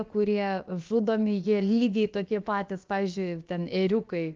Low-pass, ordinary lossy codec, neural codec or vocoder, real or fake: 7.2 kHz; Opus, 24 kbps; codec, 16 kHz, about 1 kbps, DyCAST, with the encoder's durations; fake